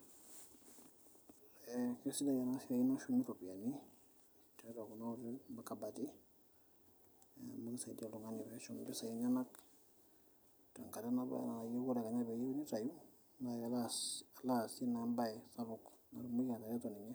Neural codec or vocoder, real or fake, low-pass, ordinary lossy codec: none; real; none; none